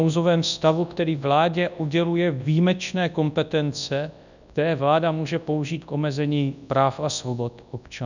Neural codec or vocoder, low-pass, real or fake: codec, 24 kHz, 0.9 kbps, WavTokenizer, large speech release; 7.2 kHz; fake